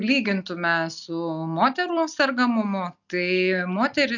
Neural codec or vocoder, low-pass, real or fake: none; 7.2 kHz; real